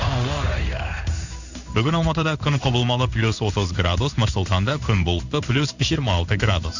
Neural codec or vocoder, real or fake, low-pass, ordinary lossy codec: codec, 16 kHz in and 24 kHz out, 1 kbps, XY-Tokenizer; fake; 7.2 kHz; none